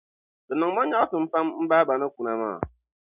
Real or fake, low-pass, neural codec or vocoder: real; 3.6 kHz; none